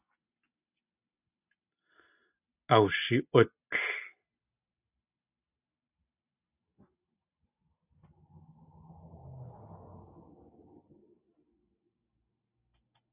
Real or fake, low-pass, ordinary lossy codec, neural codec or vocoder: real; 3.6 kHz; AAC, 32 kbps; none